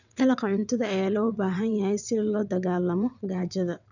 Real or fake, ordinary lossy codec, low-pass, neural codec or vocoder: fake; none; 7.2 kHz; vocoder, 22.05 kHz, 80 mel bands, WaveNeXt